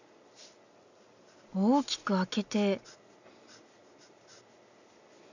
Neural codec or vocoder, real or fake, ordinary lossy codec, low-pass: none; real; none; 7.2 kHz